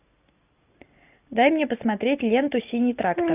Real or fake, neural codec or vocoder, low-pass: real; none; 3.6 kHz